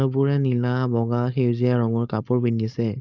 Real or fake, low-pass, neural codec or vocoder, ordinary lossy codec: fake; 7.2 kHz; codec, 16 kHz, 4.8 kbps, FACodec; none